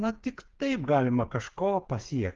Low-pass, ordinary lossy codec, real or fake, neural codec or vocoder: 7.2 kHz; Opus, 32 kbps; fake; codec, 16 kHz, 4 kbps, FreqCodec, smaller model